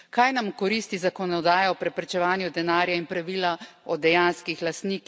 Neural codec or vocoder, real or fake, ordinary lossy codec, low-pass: none; real; none; none